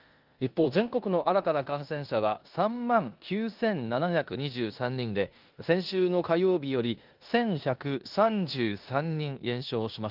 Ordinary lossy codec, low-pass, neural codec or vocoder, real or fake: Opus, 32 kbps; 5.4 kHz; codec, 16 kHz in and 24 kHz out, 0.9 kbps, LongCat-Audio-Codec, four codebook decoder; fake